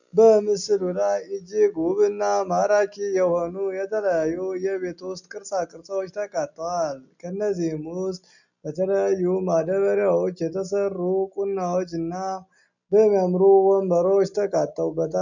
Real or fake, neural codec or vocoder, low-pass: real; none; 7.2 kHz